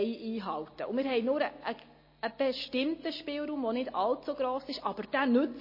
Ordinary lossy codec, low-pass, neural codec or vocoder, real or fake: MP3, 24 kbps; 5.4 kHz; none; real